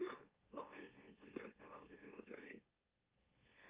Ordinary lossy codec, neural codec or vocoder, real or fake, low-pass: Opus, 24 kbps; autoencoder, 44.1 kHz, a latent of 192 numbers a frame, MeloTTS; fake; 3.6 kHz